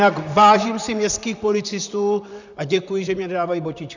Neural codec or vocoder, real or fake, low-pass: vocoder, 44.1 kHz, 128 mel bands, Pupu-Vocoder; fake; 7.2 kHz